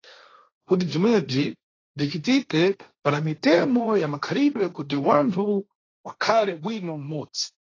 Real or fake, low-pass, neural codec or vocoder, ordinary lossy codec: fake; 7.2 kHz; codec, 16 kHz, 1.1 kbps, Voila-Tokenizer; AAC, 32 kbps